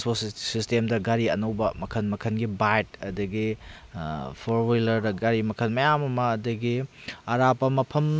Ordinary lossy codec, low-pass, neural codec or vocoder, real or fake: none; none; none; real